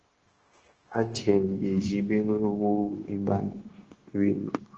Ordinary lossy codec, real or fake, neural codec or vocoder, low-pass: Opus, 16 kbps; fake; codec, 16 kHz, 0.9 kbps, LongCat-Audio-Codec; 7.2 kHz